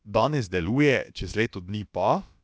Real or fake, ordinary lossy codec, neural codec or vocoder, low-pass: fake; none; codec, 16 kHz, about 1 kbps, DyCAST, with the encoder's durations; none